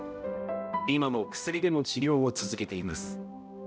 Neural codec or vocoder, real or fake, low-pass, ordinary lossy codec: codec, 16 kHz, 0.5 kbps, X-Codec, HuBERT features, trained on balanced general audio; fake; none; none